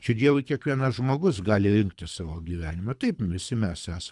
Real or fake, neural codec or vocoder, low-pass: fake; codec, 24 kHz, 3 kbps, HILCodec; 10.8 kHz